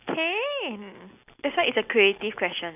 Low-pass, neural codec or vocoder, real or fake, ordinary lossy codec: 3.6 kHz; none; real; none